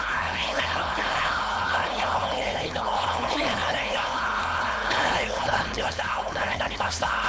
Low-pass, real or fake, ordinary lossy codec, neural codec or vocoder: none; fake; none; codec, 16 kHz, 4.8 kbps, FACodec